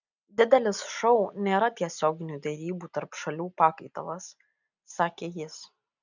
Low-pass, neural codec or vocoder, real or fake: 7.2 kHz; none; real